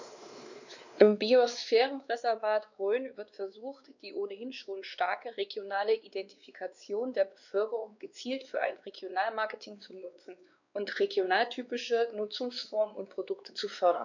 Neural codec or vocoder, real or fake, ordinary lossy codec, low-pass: codec, 16 kHz, 2 kbps, X-Codec, WavLM features, trained on Multilingual LibriSpeech; fake; none; 7.2 kHz